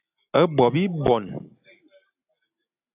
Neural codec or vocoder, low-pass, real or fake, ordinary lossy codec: none; 3.6 kHz; real; AAC, 32 kbps